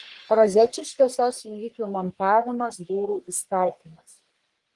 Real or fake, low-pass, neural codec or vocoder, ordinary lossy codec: fake; 10.8 kHz; codec, 44.1 kHz, 1.7 kbps, Pupu-Codec; Opus, 24 kbps